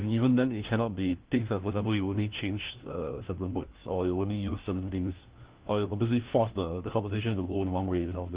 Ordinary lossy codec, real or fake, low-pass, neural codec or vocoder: Opus, 16 kbps; fake; 3.6 kHz; codec, 16 kHz, 1 kbps, FunCodec, trained on LibriTTS, 50 frames a second